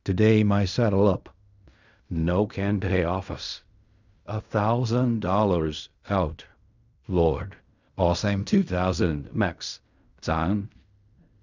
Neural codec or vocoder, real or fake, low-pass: codec, 16 kHz in and 24 kHz out, 0.4 kbps, LongCat-Audio-Codec, fine tuned four codebook decoder; fake; 7.2 kHz